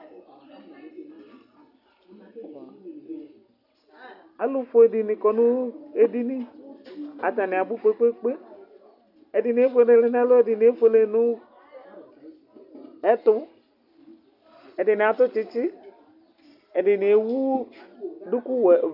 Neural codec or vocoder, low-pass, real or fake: none; 5.4 kHz; real